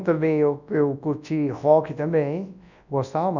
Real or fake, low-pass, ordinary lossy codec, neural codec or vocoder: fake; 7.2 kHz; none; codec, 24 kHz, 0.9 kbps, WavTokenizer, large speech release